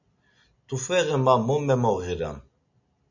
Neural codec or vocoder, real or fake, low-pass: none; real; 7.2 kHz